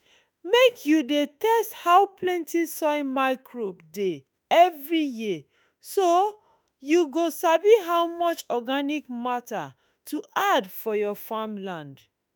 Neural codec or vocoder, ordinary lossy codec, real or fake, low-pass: autoencoder, 48 kHz, 32 numbers a frame, DAC-VAE, trained on Japanese speech; none; fake; none